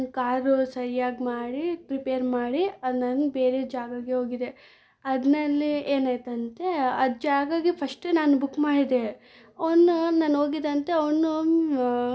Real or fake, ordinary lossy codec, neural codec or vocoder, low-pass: real; none; none; none